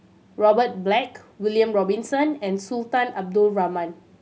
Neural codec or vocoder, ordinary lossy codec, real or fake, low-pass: none; none; real; none